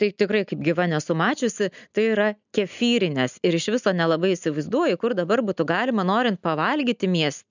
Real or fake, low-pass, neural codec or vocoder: real; 7.2 kHz; none